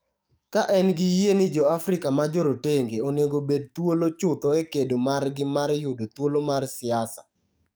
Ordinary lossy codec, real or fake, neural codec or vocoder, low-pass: none; fake; codec, 44.1 kHz, 7.8 kbps, DAC; none